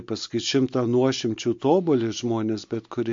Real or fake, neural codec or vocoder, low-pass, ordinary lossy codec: real; none; 7.2 kHz; MP3, 48 kbps